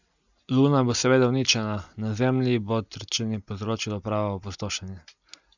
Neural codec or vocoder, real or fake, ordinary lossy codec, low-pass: none; real; none; 7.2 kHz